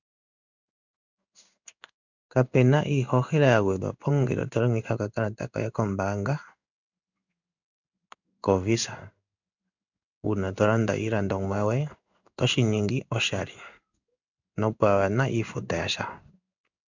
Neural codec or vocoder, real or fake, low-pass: codec, 16 kHz in and 24 kHz out, 1 kbps, XY-Tokenizer; fake; 7.2 kHz